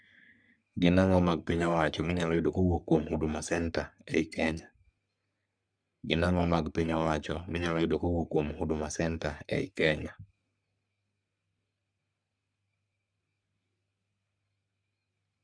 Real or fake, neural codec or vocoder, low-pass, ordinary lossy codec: fake; codec, 44.1 kHz, 3.4 kbps, Pupu-Codec; 9.9 kHz; none